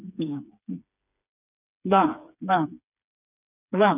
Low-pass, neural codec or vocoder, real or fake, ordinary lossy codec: 3.6 kHz; codec, 16 kHz, 4 kbps, FreqCodec, smaller model; fake; none